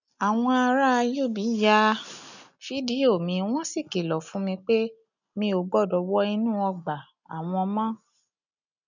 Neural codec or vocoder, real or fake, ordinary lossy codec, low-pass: none; real; none; 7.2 kHz